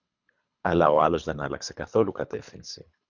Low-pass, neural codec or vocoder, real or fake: 7.2 kHz; codec, 24 kHz, 3 kbps, HILCodec; fake